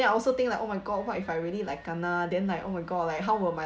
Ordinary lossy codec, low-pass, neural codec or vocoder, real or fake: none; none; none; real